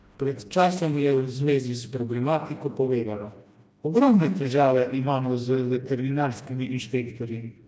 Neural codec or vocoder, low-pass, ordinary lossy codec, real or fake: codec, 16 kHz, 1 kbps, FreqCodec, smaller model; none; none; fake